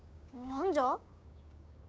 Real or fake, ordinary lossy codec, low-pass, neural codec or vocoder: fake; none; none; codec, 16 kHz, 6 kbps, DAC